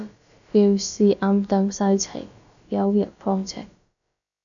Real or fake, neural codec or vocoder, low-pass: fake; codec, 16 kHz, about 1 kbps, DyCAST, with the encoder's durations; 7.2 kHz